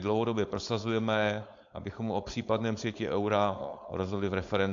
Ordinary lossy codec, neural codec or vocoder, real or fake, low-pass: AAC, 64 kbps; codec, 16 kHz, 4.8 kbps, FACodec; fake; 7.2 kHz